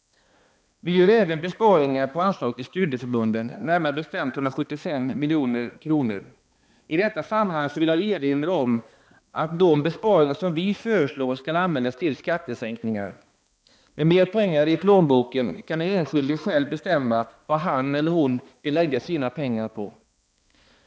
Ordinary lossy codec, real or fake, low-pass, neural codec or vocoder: none; fake; none; codec, 16 kHz, 2 kbps, X-Codec, HuBERT features, trained on balanced general audio